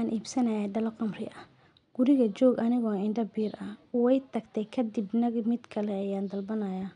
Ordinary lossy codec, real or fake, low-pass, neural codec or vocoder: none; real; 9.9 kHz; none